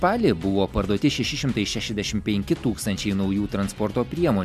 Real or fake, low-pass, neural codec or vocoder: real; 14.4 kHz; none